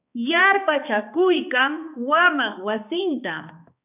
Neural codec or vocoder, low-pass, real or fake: codec, 16 kHz, 2 kbps, X-Codec, HuBERT features, trained on balanced general audio; 3.6 kHz; fake